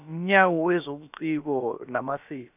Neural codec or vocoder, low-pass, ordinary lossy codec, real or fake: codec, 16 kHz, about 1 kbps, DyCAST, with the encoder's durations; 3.6 kHz; none; fake